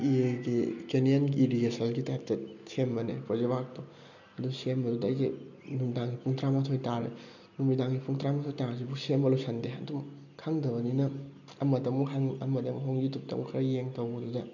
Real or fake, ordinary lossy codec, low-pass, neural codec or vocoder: real; none; 7.2 kHz; none